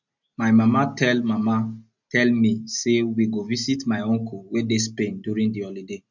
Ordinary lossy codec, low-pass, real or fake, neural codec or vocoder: none; 7.2 kHz; real; none